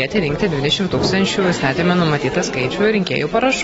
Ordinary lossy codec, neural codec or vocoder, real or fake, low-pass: AAC, 24 kbps; none; real; 19.8 kHz